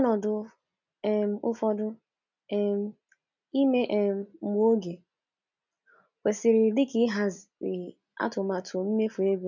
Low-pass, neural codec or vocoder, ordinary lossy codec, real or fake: 7.2 kHz; none; none; real